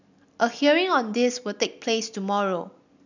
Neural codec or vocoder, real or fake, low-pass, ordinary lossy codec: none; real; 7.2 kHz; none